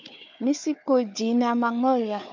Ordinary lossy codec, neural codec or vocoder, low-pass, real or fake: MP3, 64 kbps; codec, 16 kHz, 4 kbps, FunCodec, trained on Chinese and English, 50 frames a second; 7.2 kHz; fake